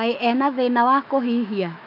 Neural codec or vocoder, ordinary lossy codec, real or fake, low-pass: autoencoder, 48 kHz, 128 numbers a frame, DAC-VAE, trained on Japanese speech; none; fake; 5.4 kHz